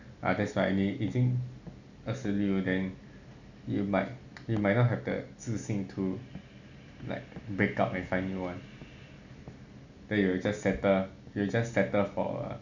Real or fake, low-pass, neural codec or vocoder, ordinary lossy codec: real; 7.2 kHz; none; none